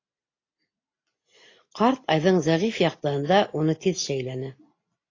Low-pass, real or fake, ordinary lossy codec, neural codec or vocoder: 7.2 kHz; real; AAC, 32 kbps; none